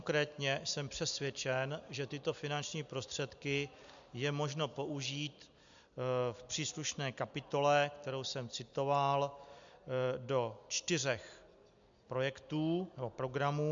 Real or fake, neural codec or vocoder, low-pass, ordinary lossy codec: real; none; 7.2 kHz; MP3, 64 kbps